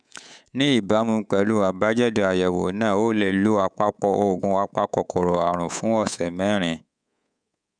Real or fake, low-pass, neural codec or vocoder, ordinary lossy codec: fake; 9.9 kHz; codec, 24 kHz, 3.1 kbps, DualCodec; none